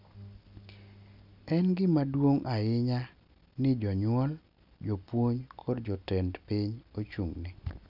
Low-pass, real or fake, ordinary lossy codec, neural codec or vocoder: 5.4 kHz; real; none; none